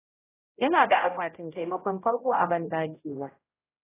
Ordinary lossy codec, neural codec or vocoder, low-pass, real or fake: AAC, 16 kbps; codec, 16 kHz, 0.5 kbps, X-Codec, HuBERT features, trained on general audio; 3.6 kHz; fake